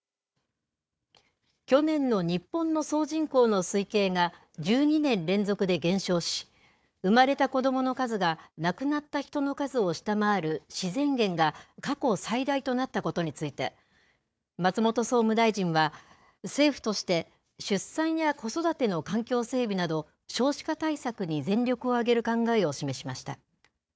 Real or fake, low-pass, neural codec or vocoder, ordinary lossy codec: fake; none; codec, 16 kHz, 4 kbps, FunCodec, trained on Chinese and English, 50 frames a second; none